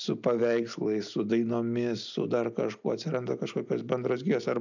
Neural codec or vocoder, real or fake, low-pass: none; real; 7.2 kHz